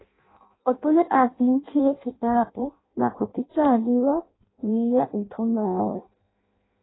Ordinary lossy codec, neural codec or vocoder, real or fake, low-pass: AAC, 16 kbps; codec, 16 kHz in and 24 kHz out, 0.6 kbps, FireRedTTS-2 codec; fake; 7.2 kHz